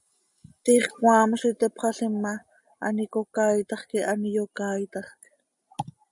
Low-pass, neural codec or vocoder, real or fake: 10.8 kHz; none; real